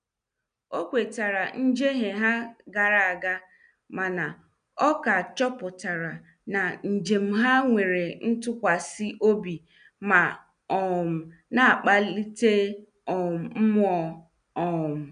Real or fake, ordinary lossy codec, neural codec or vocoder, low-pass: real; none; none; 9.9 kHz